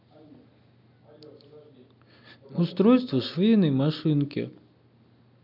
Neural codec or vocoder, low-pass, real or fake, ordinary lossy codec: none; 5.4 kHz; real; MP3, 48 kbps